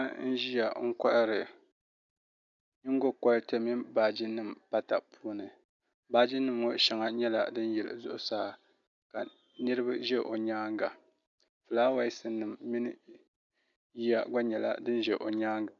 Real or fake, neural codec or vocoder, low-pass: real; none; 7.2 kHz